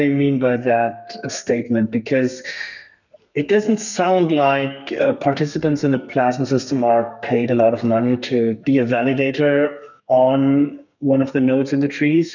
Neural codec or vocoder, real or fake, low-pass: codec, 32 kHz, 1.9 kbps, SNAC; fake; 7.2 kHz